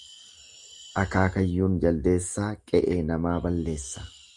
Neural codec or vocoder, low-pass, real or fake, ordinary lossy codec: none; 10.8 kHz; real; Opus, 32 kbps